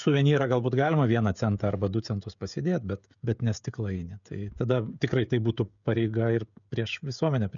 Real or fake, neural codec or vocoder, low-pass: fake; codec, 16 kHz, 16 kbps, FreqCodec, smaller model; 7.2 kHz